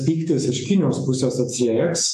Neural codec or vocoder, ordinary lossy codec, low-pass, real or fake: autoencoder, 48 kHz, 128 numbers a frame, DAC-VAE, trained on Japanese speech; AAC, 96 kbps; 14.4 kHz; fake